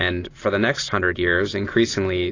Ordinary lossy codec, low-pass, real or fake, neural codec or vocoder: AAC, 32 kbps; 7.2 kHz; real; none